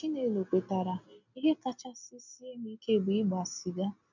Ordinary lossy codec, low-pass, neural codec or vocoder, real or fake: none; 7.2 kHz; none; real